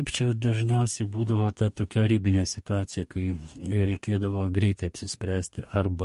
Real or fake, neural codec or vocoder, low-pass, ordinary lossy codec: fake; codec, 44.1 kHz, 2.6 kbps, DAC; 14.4 kHz; MP3, 48 kbps